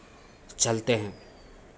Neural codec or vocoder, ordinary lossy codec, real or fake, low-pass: none; none; real; none